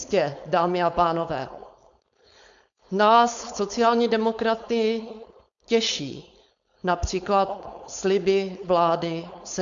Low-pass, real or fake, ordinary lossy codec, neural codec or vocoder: 7.2 kHz; fake; MP3, 96 kbps; codec, 16 kHz, 4.8 kbps, FACodec